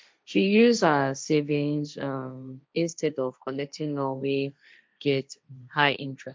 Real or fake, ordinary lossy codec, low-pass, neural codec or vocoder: fake; none; none; codec, 16 kHz, 1.1 kbps, Voila-Tokenizer